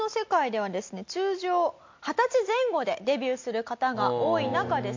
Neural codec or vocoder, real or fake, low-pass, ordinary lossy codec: none; real; 7.2 kHz; MP3, 48 kbps